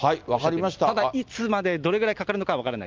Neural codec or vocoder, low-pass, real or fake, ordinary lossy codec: none; 7.2 kHz; real; Opus, 24 kbps